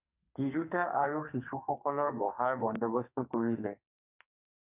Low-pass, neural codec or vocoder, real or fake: 3.6 kHz; codec, 44.1 kHz, 2.6 kbps, SNAC; fake